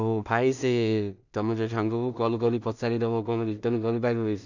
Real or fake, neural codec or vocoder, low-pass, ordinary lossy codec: fake; codec, 16 kHz in and 24 kHz out, 0.4 kbps, LongCat-Audio-Codec, two codebook decoder; 7.2 kHz; none